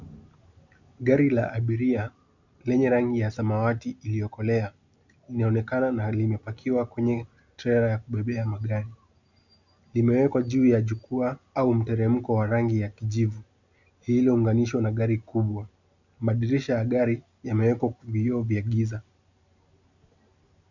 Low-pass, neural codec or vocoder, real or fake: 7.2 kHz; none; real